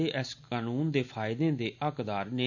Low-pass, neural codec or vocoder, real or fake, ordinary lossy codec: 7.2 kHz; none; real; none